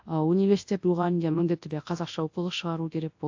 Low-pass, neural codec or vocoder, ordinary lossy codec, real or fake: 7.2 kHz; codec, 24 kHz, 0.9 kbps, WavTokenizer, large speech release; AAC, 48 kbps; fake